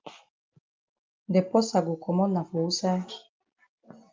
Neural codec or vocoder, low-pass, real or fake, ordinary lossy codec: none; 7.2 kHz; real; Opus, 24 kbps